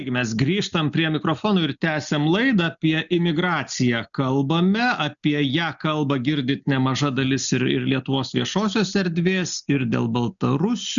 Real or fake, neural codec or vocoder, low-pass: real; none; 7.2 kHz